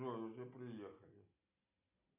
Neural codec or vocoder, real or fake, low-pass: none; real; 3.6 kHz